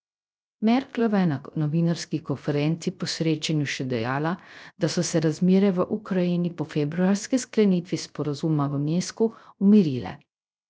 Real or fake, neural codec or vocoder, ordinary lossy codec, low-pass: fake; codec, 16 kHz, 0.3 kbps, FocalCodec; none; none